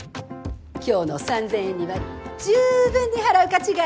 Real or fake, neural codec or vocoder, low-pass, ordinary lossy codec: real; none; none; none